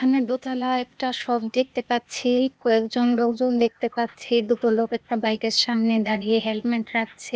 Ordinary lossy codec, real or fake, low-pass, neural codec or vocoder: none; fake; none; codec, 16 kHz, 0.8 kbps, ZipCodec